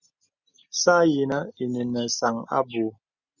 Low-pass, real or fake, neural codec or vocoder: 7.2 kHz; real; none